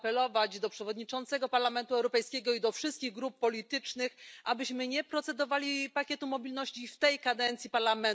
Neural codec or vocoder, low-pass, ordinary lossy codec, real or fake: none; none; none; real